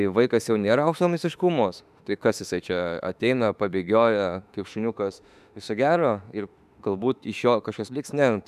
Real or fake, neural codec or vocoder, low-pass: fake; autoencoder, 48 kHz, 32 numbers a frame, DAC-VAE, trained on Japanese speech; 14.4 kHz